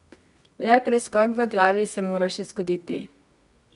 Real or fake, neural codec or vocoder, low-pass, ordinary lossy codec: fake; codec, 24 kHz, 0.9 kbps, WavTokenizer, medium music audio release; 10.8 kHz; none